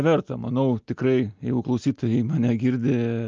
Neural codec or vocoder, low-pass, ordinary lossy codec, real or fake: none; 7.2 kHz; Opus, 24 kbps; real